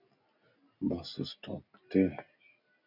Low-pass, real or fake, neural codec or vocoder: 5.4 kHz; real; none